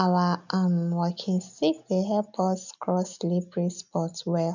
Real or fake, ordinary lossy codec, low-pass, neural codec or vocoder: real; none; 7.2 kHz; none